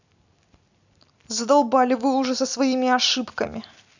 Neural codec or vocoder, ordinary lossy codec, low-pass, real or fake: none; none; 7.2 kHz; real